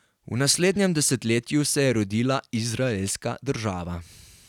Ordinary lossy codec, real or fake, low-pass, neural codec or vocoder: none; real; 19.8 kHz; none